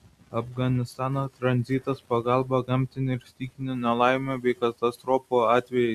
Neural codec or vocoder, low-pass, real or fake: none; 14.4 kHz; real